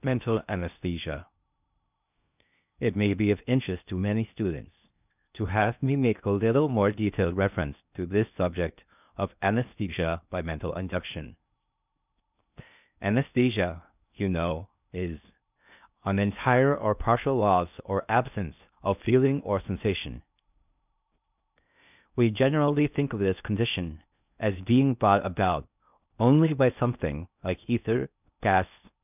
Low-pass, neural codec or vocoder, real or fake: 3.6 kHz; codec, 16 kHz in and 24 kHz out, 0.6 kbps, FocalCodec, streaming, 2048 codes; fake